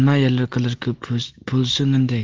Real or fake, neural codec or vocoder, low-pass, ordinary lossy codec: real; none; 7.2 kHz; Opus, 16 kbps